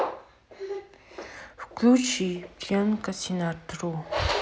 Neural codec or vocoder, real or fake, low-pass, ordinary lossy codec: none; real; none; none